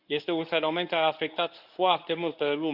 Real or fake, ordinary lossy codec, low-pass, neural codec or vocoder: fake; none; 5.4 kHz; codec, 24 kHz, 0.9 kbps, WavTokenizer, medium speech release version 1